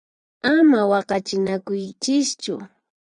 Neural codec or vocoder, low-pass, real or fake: vocoder, 22.05 kHz, 80 mel bands, Vocos; 9.9 kHz; fake